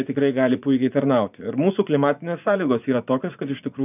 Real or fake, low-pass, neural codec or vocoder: fake; 3.6 kHz; vocoder, 24 kHz, 100 mel bands, Vocos